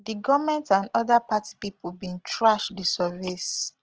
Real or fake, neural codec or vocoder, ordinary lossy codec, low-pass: real; none; Opus, 16 kbps; 7.2 kHz